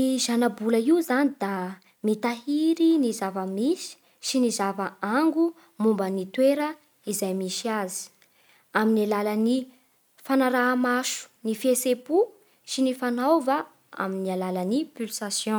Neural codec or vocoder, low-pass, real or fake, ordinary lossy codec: none; none; real; none